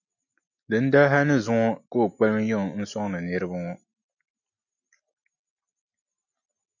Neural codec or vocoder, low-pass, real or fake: none; 7.2 kHz; real